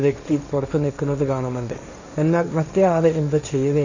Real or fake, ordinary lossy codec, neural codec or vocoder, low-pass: fake; none; codec, 16 kHz, 1.1 kbps, Voila-Tokenizer; 7.2 kHz